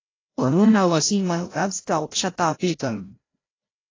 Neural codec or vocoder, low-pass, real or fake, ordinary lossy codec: codec, 16 kHz, 0.5 kbps, FreqCodec, larger model; 7.2 kHz; fake; AAC, 32 kbps